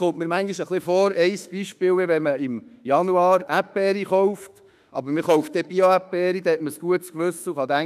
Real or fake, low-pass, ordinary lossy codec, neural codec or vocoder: fake; 14.4 kHz; none; autoencoder, 48 kHz, 32 numbers a frame, DAC-VAE, trained on Japanese speech